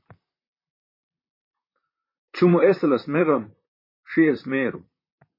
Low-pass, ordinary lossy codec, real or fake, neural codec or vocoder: 5.4 kHz; MP3, 24 kbps; fake; vocoder, 44.1 kHz, 128 mel bands, Pupu-Vocoder